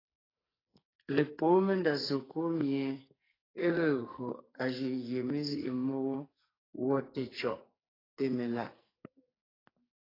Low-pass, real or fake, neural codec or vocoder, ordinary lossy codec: 5.4 kHz; fake; codec, 44.1 kHz, 2.6 kbps, SNAC; AAC, 24 kbps